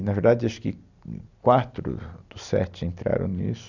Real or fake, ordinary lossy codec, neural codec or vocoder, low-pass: real; none; none; 7.2 kHz